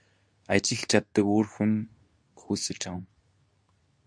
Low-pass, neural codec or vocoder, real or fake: 9.9 kHz; codec, 24 kHz, 0.9 kbps, WavTokenizer, medium speech release version 2; fake